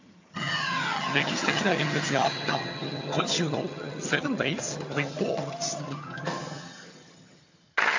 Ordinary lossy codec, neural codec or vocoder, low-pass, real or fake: AAC, 48 kbps; vocoder, 22.05 kHz, 80 mel bands, HiFi-GAN; 7.2 kHz; fake